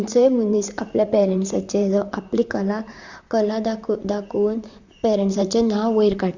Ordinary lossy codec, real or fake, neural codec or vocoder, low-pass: Opus, 64 kbps; fake; vocoder, 44.1 kHz, 128 mel bands, Pupu-Vocoder; 7.2 kHz